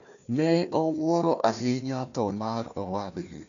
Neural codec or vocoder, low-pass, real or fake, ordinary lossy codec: codec, 16 kHz, 1 kbps, FunCodec, trained on Chinese and English, 50 frames a second; 7.2 kHz; fake; none